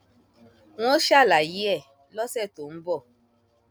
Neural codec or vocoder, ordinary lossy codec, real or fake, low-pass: none; none; real; none